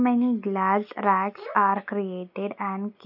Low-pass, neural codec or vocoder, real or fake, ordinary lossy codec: 5.4 kHz; none; real; none